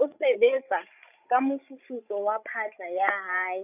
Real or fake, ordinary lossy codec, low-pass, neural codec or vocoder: fake; none; 3.6 kHz; codec, 16 kHz, 16 kbps, FreqCodec, larger model